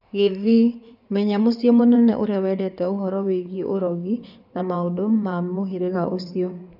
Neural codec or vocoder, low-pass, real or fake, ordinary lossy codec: codec, 16 kHz in and 24 kHz out, 2.2 kbps, FireRedTTS-2 codec; 5.4 kHz; fake; none